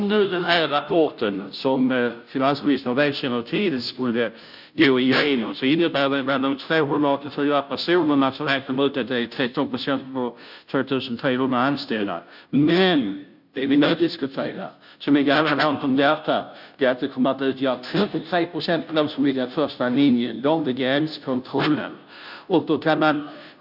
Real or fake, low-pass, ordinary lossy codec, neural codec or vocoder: fake; 5.4 kHz; none; codec, 16 kHz, 0.5 kbps, FunCodec, trained on Chinese and English, 25 frames a second